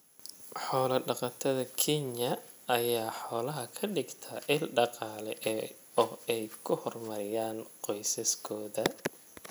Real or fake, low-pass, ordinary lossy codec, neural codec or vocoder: real; none; none; none